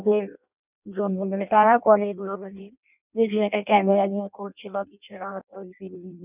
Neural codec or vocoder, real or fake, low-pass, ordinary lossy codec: codec, 16 kHz in and 24 kHz out, 0.6 kbps, FireRedTTS-2 codec; fake; 3.6 kHz; none